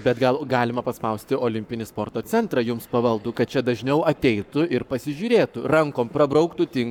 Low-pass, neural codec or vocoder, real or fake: 19.8 kHz; codec, 44.1 kHz, 7.8 kbps, Pupu-Codec; fake